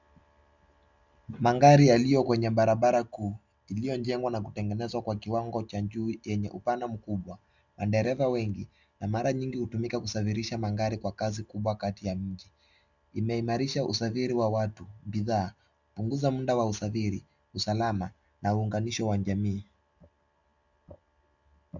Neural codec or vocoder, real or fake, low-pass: none; real; 7.2 kHz